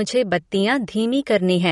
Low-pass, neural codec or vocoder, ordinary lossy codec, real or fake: 19.8 kHz; none; MP3, 48 kbps; real